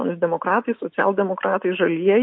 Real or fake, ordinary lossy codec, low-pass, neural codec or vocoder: real; MP3, 32 kbps; 7.2 kHz; none